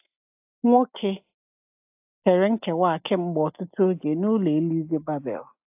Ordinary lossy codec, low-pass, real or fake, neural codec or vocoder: AAC, 32 kbps; 3.6 kHz; real; none